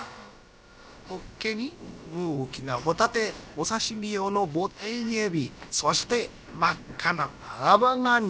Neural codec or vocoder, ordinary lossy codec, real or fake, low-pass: codec, 16 kHz, about 1 kbps, DyCAST, with the encoder's durations; none; fake; none